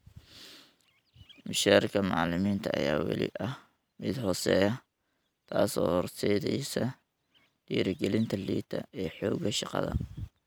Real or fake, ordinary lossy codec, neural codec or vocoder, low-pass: real; none; none; none